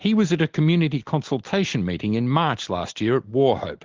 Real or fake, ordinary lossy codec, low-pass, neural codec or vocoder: real; Opus, 16 kbps; 7.2 kHz; none